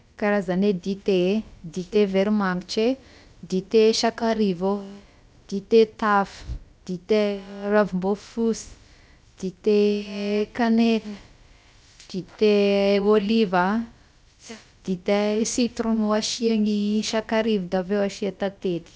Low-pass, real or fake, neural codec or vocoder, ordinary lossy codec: none; fake; codec, 16 kHz, about 1 kbps, DyCAST, with the encoder's durations; none